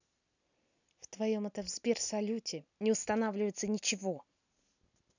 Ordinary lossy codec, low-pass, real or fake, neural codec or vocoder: none; 7.2 kHz; real; none